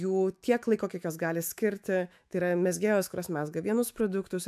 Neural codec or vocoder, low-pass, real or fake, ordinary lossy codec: autoencoder, 48 kHz, 128 numbers a frame, DAC-VAE, trained on Japanese speech; 14.4 kHz; fake; AAC, 96 kbps